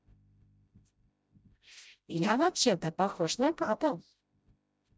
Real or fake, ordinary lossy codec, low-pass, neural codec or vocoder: fake; none; none; codec, 16 kHz, 0.5 kbps, FreqCodec, smaller model